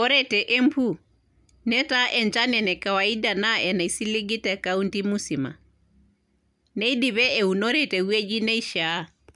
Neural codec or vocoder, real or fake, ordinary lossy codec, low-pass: none; real; none; 10.8 kHz